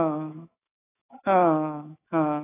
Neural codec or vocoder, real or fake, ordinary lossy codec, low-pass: autoencoder, 48 kHz, 128 numbers a frame, DAC-VAE, trained on Japanese speech; fake; AAC, 16 kbps; 3.6 kHz